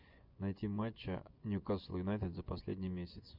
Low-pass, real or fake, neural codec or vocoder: 5.4 kHz; fake; vocoder, 24 kHz, 100 mel bands, Vocos